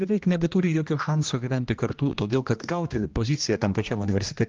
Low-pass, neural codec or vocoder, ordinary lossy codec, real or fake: 7.2 kHz; codec, 16 kHz, 1 kbps, X-Codec, HuBERT features, trained on general audio; Opus, 24 kbps; fake